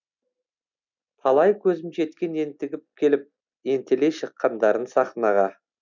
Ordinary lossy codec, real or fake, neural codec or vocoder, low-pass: none; real; none; 7.2 kHz